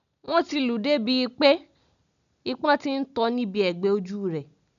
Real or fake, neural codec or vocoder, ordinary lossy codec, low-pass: real; none; none; 7.2 kHz